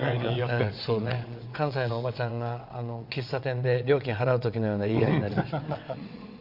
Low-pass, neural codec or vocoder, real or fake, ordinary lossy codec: 5.4 kHz; vocoder, 22.05 kHz, 80 mel bands, WaveNeXt; fake; Opus, 64 kbps